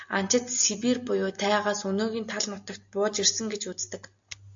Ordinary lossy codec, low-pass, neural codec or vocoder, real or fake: AAC, 64 kbps; 7.2 kHz; none; real